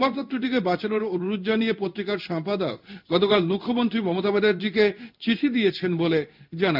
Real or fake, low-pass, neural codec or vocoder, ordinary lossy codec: fake; 5.4 kHz; codec, 16 kHz in and 24 kHz out, 1 kbps, XY-Tokenizer; none